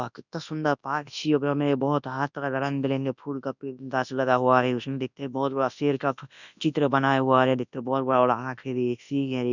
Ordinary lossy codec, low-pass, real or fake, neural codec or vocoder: none; 7.2 kHz; fake; codec, 24 kHz, 0.9 kbps, WavTokenizer, large speech release